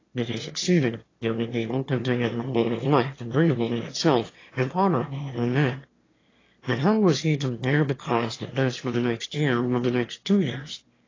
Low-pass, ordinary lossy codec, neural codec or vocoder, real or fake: 7.2 kHz; AAC, 32 kbps; autoencoder, 22.05 kHz, a latent of 192 numbers a frame, VITS, trained on one speaker; fake